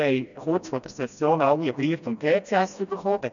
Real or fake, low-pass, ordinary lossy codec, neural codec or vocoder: fake; 7.2 kHz; none; codec, 16 kHz, 1 kbps, FreqCodec, smaller model